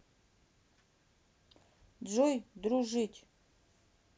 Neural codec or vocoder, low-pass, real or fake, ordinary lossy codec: none; none; real; none